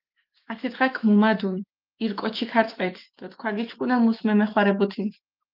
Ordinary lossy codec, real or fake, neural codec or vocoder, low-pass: Opus, 24 kbps; fake; autoencoder, 48 kHz, 128 numbers a frame, DAC-VAE, trained on Japanese speech; 5.4 kHz